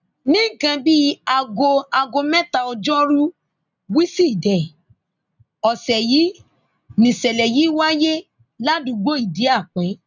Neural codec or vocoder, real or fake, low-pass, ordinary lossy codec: none; real; 7.2 kHz; none